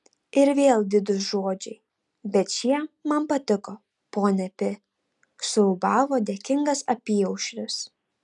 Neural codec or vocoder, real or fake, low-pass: none; real; 10.8 kHz